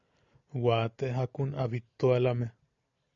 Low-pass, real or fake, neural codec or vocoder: 7.2 kHz; real; none